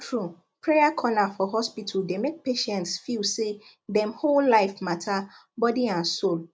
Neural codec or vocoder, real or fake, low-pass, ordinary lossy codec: none; real; none; none